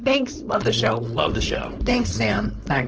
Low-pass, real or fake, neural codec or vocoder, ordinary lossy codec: 7.2 kHz; fake; codec, 16 kHz, 4.8 kbps, FACodec; Opus, 16 kbps